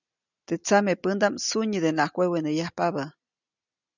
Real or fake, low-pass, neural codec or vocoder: real; 7.2 kHz; none